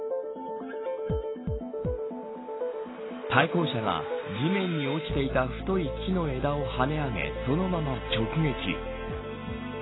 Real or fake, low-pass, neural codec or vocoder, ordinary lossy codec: real; 7.2 kHz; none; AAC, 16 kbps